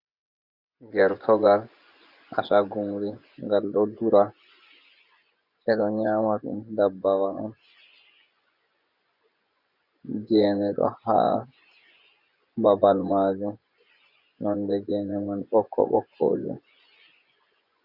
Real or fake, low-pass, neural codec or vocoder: fake; 5.4 kHz; vocoder, 24 kHz, 100 mel bands, Vocos